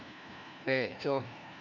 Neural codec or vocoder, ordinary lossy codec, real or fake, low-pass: codec, 16 kHz, 1 kbps, FunCodec, trained on LibriTTS, 50 frames a second; AAC, 48 kbps; fake; 7.2 kHz